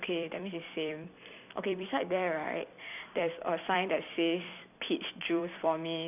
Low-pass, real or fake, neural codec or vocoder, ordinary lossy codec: 3.6 kHz; fake; vocoder, 44.1 kHz, 128 mel bands every 256 samples, BigVGAN v2; none